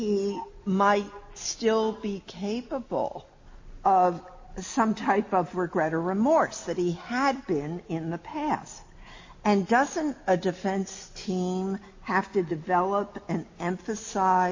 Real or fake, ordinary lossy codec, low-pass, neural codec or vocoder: real; MP3, 32 kbps; 7.2 kHz; none